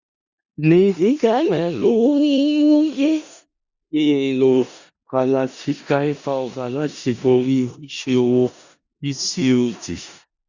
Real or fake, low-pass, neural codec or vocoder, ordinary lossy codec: fake; 7.2 kHz; codec, 16 kHz in and 24 kHz out, 0.4 kbps, LongCat-Audio-Codec, four codebook decoder; Opus, 64 kbps